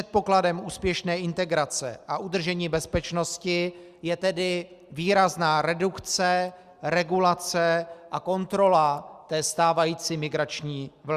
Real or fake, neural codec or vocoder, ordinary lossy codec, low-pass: real; none; Opus, 64 kbps; 14.4 kHz